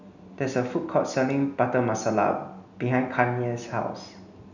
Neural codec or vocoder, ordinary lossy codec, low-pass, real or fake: none; none; 7.2 kHz; real